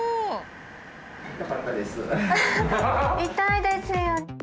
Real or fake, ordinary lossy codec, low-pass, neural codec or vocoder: real; none; none; none